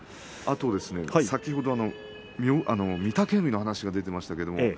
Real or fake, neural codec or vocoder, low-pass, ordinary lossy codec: real; none; none; none